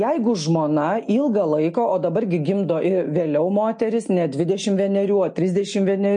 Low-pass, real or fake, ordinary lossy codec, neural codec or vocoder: 9.9 kHz; real; MP3, 48 kbps; none